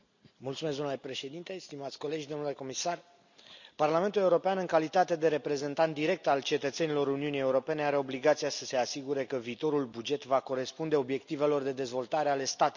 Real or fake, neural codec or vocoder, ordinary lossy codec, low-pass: real; none; none; 7.2 kHz